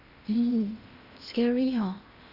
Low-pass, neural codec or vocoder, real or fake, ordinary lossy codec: 5.4 kHz; codec, 16 kHz in and 24 kHz out, 0.8 kbps, FocalCodec, streaming, 65536 codes; fake; Opus, 64 kbps